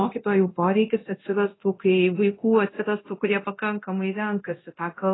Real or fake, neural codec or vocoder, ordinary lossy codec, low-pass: fake; codec, 24 kHz, 0.9 kbps, DualCodec; AAC, 16 kbps; 7.2 kHz